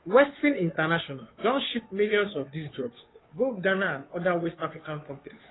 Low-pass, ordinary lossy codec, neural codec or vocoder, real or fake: 7.2 kHz; AAC, 16 kbps; codec, 16 kHz, 2 kbps, FunCodec, trained on Chinese and English, 25 frames a second; fake